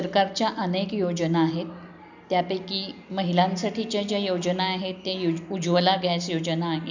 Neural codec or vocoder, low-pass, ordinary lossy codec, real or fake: none; 7.2 kHz; none; real